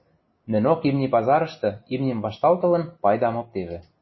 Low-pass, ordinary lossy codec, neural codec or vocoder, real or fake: 7.2 kHz; MP3, 24 kbps; none; real